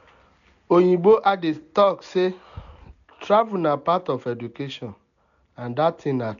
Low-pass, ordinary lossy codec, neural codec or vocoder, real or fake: 7.2 kHz; none; none; real